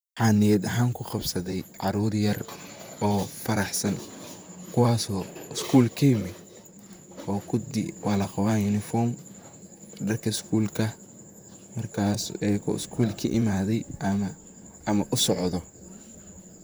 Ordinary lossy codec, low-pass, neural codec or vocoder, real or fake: none; none; vocoder, 44.1 kHz, 128 mel bands, Pupu-Vocoder; fake